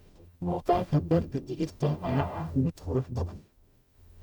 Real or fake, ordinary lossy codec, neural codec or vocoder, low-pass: fake; none; codec, 44.1 kHz, 0.9 kbps, DAC; 19.8 kHz